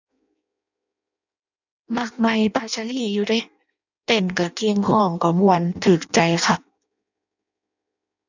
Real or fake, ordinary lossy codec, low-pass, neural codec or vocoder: fake; none; 7.2 kHz; codec, 16 kHz in and 24 kHz out, 0.6 kbps, FireRedTTS-2 codec